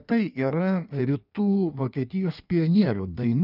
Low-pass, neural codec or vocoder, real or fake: 5.4 kHz; codec, 16 kHz in and 24 kHz out, 1.1 kbps, FireRedTTS-2 codec; fake